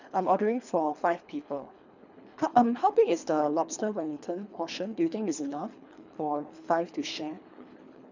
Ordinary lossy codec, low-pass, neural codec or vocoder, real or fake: none; 7.2 kHz; codec, 24 kHz, 3 kbps, HILCodec; fake